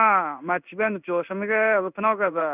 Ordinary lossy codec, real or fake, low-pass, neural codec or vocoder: none; fake; 3.6 kHz; codec, 16 kHz in and 24 kHz out, 1 kbps, XY-Tokenizer